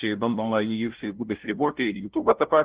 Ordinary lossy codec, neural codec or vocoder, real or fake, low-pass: Opus, 16 kbps; codec, 16 kHz, 0.5 kbps, FunCodec, trained on LibriTTS, 25 frames a second; fake; 3.6 kHz